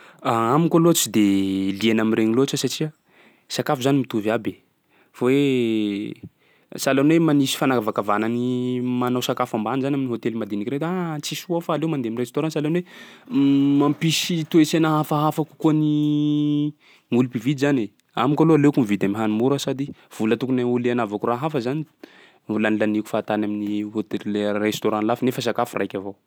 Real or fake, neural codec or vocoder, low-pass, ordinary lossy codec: real; none; none; none